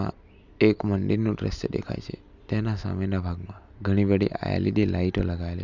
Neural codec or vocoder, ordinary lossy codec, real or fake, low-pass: none; none; real; 7.2 kHz